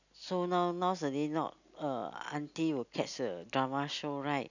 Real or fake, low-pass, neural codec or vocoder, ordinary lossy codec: real; 7.2 kHz; none; none